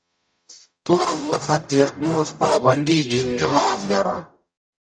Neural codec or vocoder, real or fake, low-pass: codec, 44.1 kHz, 0.9 kbps, DAC; fake; 9.9 kHz